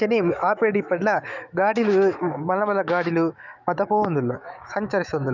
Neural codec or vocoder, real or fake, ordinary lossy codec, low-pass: autoencoder, 48 kHz, 128 numbers a frame, DAC-VAE, trained on Japanese speech; fake; none; 7.2 kHz